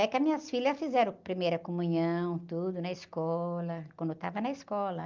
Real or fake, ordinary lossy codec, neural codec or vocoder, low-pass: real; Opus, 24 kbps; none; 7.2 kHz